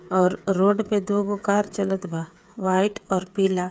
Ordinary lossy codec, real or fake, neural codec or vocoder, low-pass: none; fake; codec, 16 kHz, 8 kbps, FreqCodec, smaller model; none